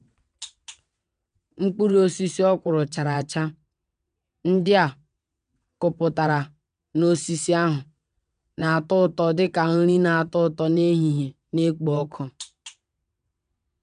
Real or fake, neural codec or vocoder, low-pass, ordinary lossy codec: fake; vocoder, 22.05 kHz, 80 mel bands, WaveNeXt; 9.9 kHz; none